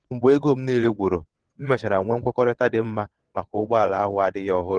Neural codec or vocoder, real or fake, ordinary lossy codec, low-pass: vocoder, 22.05 kHz, 80 mel bands, WaveNeXt; fake; Opus, 16 kbps; 9.9 kHz